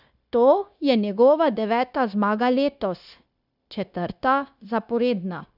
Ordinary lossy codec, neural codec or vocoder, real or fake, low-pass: none; codec, 16 kHz, 0.9 kbps, LongCat-Audio-Codec; fake; 5.4 kHz